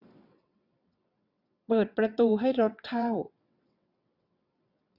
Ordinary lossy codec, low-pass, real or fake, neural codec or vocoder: none; 5.4 kHz; fake; vocoder, 22.05 kHz, 80 mel bands, WaveNeXt